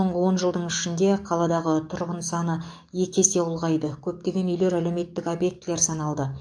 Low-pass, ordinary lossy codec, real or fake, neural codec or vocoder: 9.9 kHz; AAC, 64 kbps; fake; codec, 44.1 kHz, 7.8 kbps, DAC